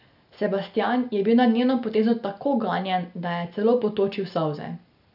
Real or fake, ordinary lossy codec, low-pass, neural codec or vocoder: real; none; 5.4 kHz; none